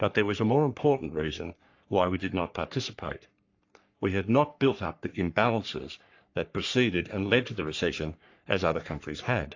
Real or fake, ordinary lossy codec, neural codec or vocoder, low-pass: fake; AAC, 48 kbps; codec, 44.1 kHz, 3.4 kbps, Pupu-Codec; 7.2 kHz